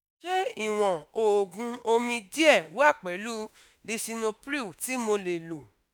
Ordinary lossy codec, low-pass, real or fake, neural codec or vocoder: none; none; fake; autoencoder, 48 kHz, 32 numbers a frame, DAC-VAE, trained on Japanese speech